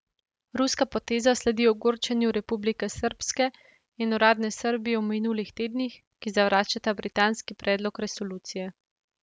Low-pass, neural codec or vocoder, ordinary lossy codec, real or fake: none; none; none; real